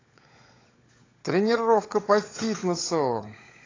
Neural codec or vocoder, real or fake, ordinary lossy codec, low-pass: codec, 16 kHz, 16 kbps, FunCodec, trained on LibriTTS, 50 frames a second; fake; AAC, 32 kbps; 7.2 kHz